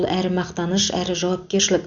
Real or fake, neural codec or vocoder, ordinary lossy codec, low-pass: real; none; Opus, 64 kbps; 7.2 kHz